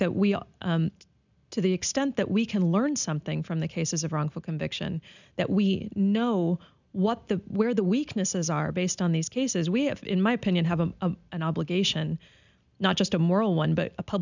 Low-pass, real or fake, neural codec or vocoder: 7.2 kHz; real; none